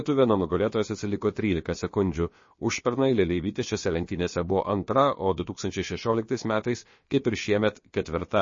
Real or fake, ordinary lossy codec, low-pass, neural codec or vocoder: fake; MP3, 32 kbps; 7.2 kHz; codec, 16 kHz, about 1 kbps, DyCAST, with the encoder's durations